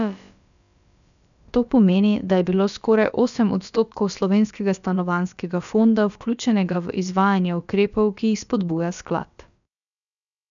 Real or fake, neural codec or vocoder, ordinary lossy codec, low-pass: fake; codec, 16 kHz, about 1 kbps, DyCAST, with the encoder's durations; none; 7.2 kHz